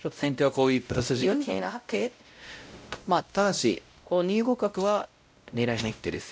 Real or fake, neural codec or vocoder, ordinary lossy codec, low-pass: fake; codec, 16 kHz, 0.5 kbps, X-Codec, WavLM features, trained on Multilingual LibriSpeech; none; none